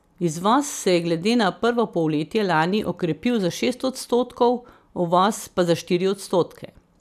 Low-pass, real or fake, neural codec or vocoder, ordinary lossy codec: 14.4 kHz; real; none; none